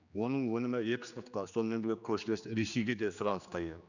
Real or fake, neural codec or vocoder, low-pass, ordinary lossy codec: fake; codec, 16 kHz, 2 kbps, X-Codec, HuBERT features, trained on general audio; 7.2 kHz; none